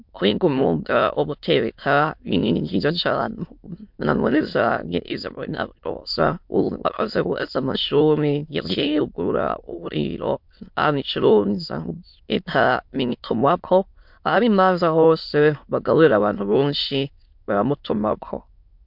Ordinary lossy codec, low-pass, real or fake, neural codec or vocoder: MP3, 48 kbps; 5.4 kHz; fake; autoencoder, 22.05 kHz, a latent of 192 numbers a frame, VITS, trained on many speakers